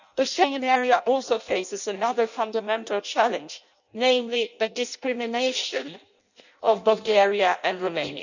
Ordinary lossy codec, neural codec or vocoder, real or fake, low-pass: none; codec, 16 kHz in and 24 kHz out, 0.6 kbps, FireRedTTS-2 codec; fake; 7.2 kHz